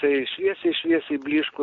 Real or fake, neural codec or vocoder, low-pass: real; none; 7.2 kHz